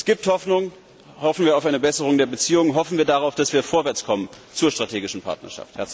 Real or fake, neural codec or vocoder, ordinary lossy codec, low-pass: real; none; none; none